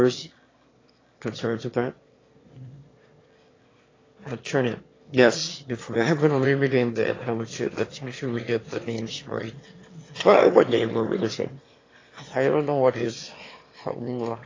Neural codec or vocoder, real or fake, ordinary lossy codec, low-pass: autoencoder, 22.05 kHz, a latent of 192 numbers a frame, VITS, trained on one speaker; fake; AAC, 32 kbps; 7.2 kHz